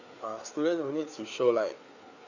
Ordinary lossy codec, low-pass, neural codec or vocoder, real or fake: none; 7.2 kHz; codec, 16 kHz, 4 kbps, FreqCodec, larger model; fake